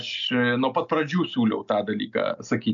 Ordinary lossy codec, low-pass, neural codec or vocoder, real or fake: MP3, 96 kbps; 7.2 kHz; none; real